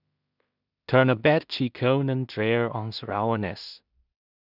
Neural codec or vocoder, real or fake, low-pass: codec, 16 kHz in and 24 kHz out, 0.4 kbps, LongCat-Audio-Codec, two codebook decoder; fake; 5.4 kHz